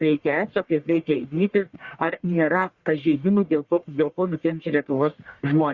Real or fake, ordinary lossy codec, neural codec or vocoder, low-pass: fake; Opus, 64 kbps; codec, 44.1 kHz, 1.7 kbps, Pupu-Codec; 7.2 kHz